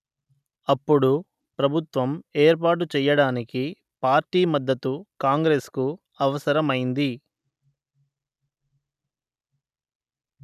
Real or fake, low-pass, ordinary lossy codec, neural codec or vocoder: real; 14.4 kHz; none; none